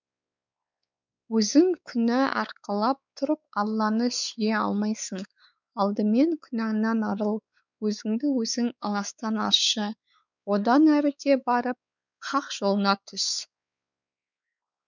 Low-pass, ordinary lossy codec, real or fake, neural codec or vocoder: 7.2 kHz; none; fake; codec, 16 kHz, 4 kbps, X-Codec, WavLM features, trained on Multilingual LibriSpeech